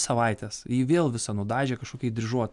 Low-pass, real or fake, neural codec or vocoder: 10.8 kHz; real; none